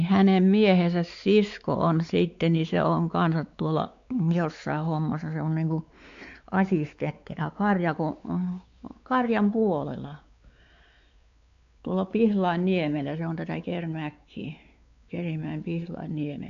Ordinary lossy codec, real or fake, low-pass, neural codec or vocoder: AAC, 64 kbps; fake; 7.2 kHz; codec, 16 kHz, 4 kbps, X-Codec, WavLM features, trained on Multilingual LibriSpeech